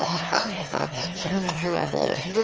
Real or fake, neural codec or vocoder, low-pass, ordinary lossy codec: fake; autoencoder, 22.05 kHz, a latent of 192 numbers a frame, VITS, trained on one speaker; 7.2 kHz; Opus, 24 kbps